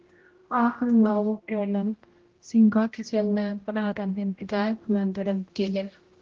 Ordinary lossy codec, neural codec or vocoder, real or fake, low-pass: Opus, 16 kbps; codec, 16 kHz, 0.5 kbps, X-Codec, HuBERT features, trained on general audio; fake; 7.2 kHz